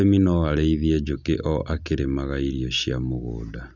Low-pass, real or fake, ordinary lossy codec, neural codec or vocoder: 7.2 kHz; real; none; none